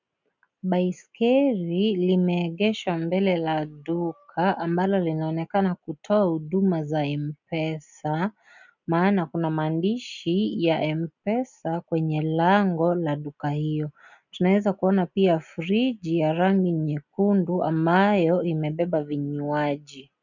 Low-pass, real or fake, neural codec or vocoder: 7.2 kHz; real; none